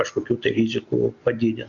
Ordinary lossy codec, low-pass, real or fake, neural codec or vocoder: Opus, 64 kbps; 7.2 kHz; real; none